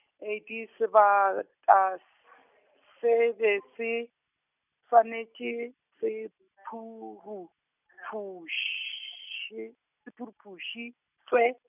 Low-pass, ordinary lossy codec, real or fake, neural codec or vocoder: 3.6 kHz; none; real; none